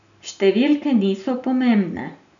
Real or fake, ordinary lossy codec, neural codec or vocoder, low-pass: real; none; none; 7.2 kHz